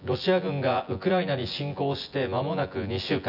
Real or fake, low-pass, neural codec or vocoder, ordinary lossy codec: fake; 5.4 kHz; vocoder, 24 kHz, 100 mel bands, Vocos; none